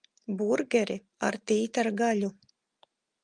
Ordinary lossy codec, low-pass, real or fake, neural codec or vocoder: Opus, 32 kbps; 9.9 kHz; real; none